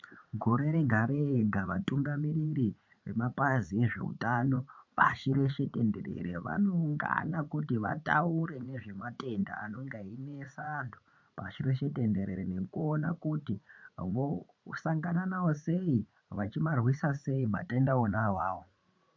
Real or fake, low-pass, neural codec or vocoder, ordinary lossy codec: fake; 7.2 kHz; vocoder, 44.1 kHz, 80 mel bands, Vocos; MP3, 48 kbps